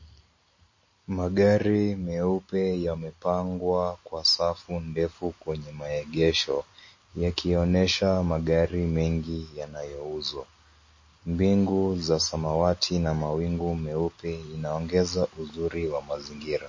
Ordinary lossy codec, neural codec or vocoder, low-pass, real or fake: MP3, 32 kbps; none; 7.2 kHz; real